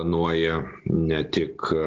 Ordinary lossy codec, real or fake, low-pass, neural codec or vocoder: Opus, 16 kbps; real; 7.2 kHz; none